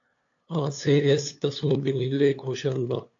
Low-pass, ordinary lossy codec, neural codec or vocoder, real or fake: 7.2 kHz; AAC, 48 kbps; codec, 16 kHz, 2 kbps, FunCodec, trained on LibriTTS, 25 frames a second; fake